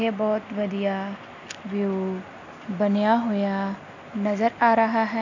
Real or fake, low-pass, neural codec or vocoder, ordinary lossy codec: real; 7.2 kHz; none; none